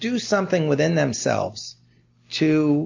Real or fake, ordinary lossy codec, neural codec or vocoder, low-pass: real; MP3, 64 kbps; none; 7.2 kHz